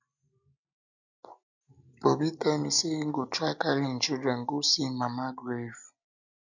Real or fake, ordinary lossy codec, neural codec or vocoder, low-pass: real; none; none; 7.2 kHz